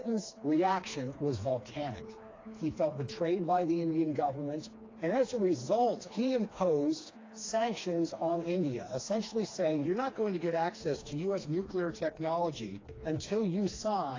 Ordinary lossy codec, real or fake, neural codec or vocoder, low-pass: AAC, 32 kbps; fake; codec, 16 kHz, 2 kbps, FreqCodec, smaller model; 7.2 kHz